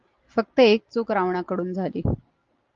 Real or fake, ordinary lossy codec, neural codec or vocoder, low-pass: real; Opus, 32 kbps; none; 7.2 kHz